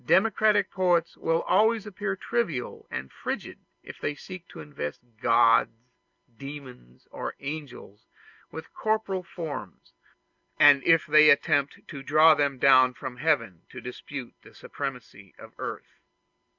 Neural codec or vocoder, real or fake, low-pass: none; real; 7.2 kHz